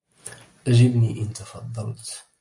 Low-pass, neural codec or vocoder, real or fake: 10.8 kHz; none; real